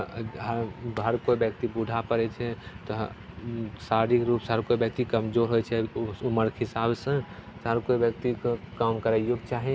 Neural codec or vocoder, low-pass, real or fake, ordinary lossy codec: none; none; real; none